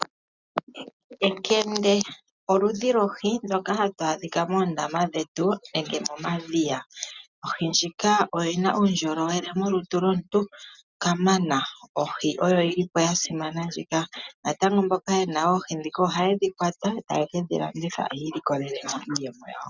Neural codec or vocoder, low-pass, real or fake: none; 7.2 kHz; real